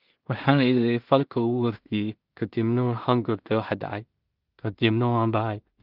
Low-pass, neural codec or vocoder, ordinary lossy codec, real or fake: 5.4 kHz; codec, 16 kHz in and 24 kHz out, 0.4 kbps, LongCat-Audio-Codec, two codebook decoder; Opus, 24 kbps; fake